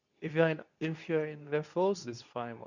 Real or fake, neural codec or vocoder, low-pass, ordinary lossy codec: fake; codec, 24 kHz, 0.9 kbps, WavTokenizer, medium speech release version 2; 7.2 kHz; none